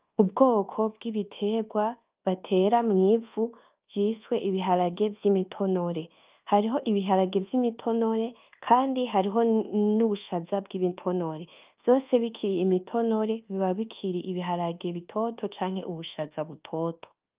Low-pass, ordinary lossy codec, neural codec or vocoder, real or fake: 3.6 kHz; Opus, 32 kbps; codec, 24 kHz, 1.2 kbps, DualCodec; fake